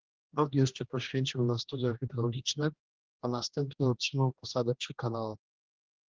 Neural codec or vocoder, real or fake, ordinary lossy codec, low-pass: codec, 16 kHz, 1 kbps, X-Codec, HuBERT features, trained on general audio; fake; Opus, 16 kbps; 7.2 kHz